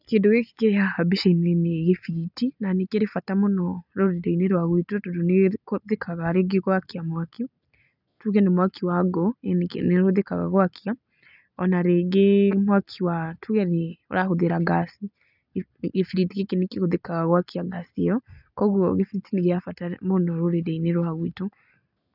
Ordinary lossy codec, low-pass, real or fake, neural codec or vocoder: none; 5.4 kHz; real; none